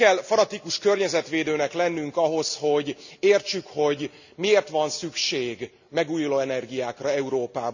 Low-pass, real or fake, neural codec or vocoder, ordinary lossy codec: 7.2 kHz; real; none; none